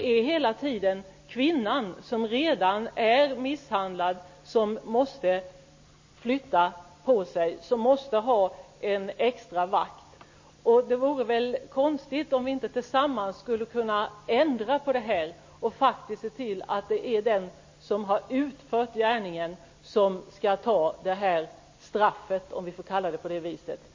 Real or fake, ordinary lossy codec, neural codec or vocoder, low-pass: real; MP3, 32 kbps; none; 7.2 kHz